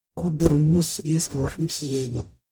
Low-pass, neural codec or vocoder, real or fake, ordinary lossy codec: none; codec, 44.1 kHz, 0.9 kbps, DAC; fake; none